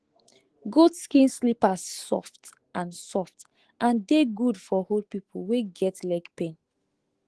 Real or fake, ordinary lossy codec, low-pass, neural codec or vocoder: fake; Opus, 16 kbps; 10.8 kHz; autoencoder, 48 kHz, 128 numbers a frame, DAC-VAE, trained on Japanese speech